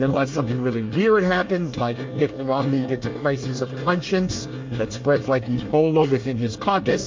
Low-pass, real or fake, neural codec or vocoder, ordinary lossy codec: 7.2 kHz; fake; codec, 24 kHz, 1 kbps, SNAC; MP3, 48 kbps